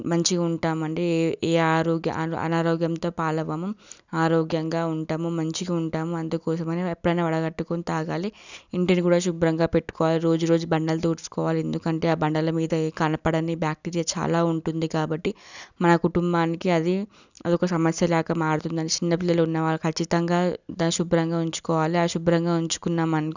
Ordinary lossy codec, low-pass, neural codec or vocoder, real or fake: none; 7.2 kHz; none; real